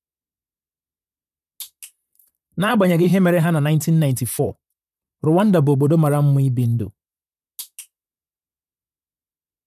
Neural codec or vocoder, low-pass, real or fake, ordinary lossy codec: vocoder, 44.1 kHz, 128 mel bands, Pupu-Vocoder; 14.4 kHz; fake; none